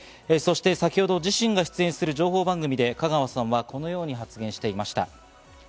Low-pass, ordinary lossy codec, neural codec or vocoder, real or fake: none; none; none; real